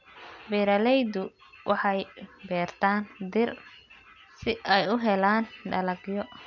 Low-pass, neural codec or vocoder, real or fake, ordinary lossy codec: 7.2 kHz; none; real; none